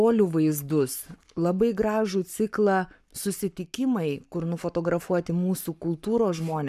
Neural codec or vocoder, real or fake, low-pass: codec, 44.1 kHz, 7.8 kbps, Pupu-Codec; fake; 14.4 kHz